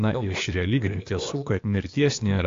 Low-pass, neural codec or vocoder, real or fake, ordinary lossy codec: 7.2 kHz; codec, 16 kHz, 0.8 kbps, ZipCodec; fake; AAC, 48 kbps